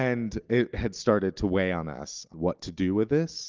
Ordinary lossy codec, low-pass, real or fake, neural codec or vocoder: Opus, 32 kbps; 7.2 kHz; real; none